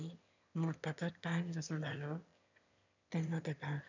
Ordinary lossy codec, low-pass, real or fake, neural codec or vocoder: none; 7.2 kHz; fake; autoencoder, 22.05 kHz, a latent of 192 numbers a frame, VITS, trained on one speaker